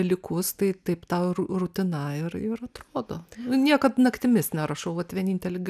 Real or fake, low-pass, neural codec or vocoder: real; 14.4 kHz; none